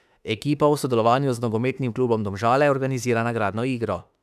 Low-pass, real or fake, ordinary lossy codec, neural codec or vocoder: 14.4 kHz; fake; none; autoencoder, 48 kHz, 32 numbers a frame, DAC-VAE, trained on Japanese speech